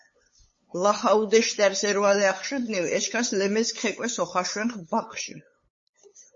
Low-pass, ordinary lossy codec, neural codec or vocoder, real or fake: 7.2 kHz; MP3, 32 kbps; codec, 16 kHz, 8 kbps, FunCodec, trained on LibriTTS, 25 frames a second; fake